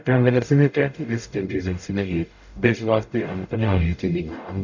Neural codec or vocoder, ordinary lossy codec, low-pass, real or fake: codec, 44.1 kHz, 0.9 kbps, DAC; none; 7.2 kHz; fake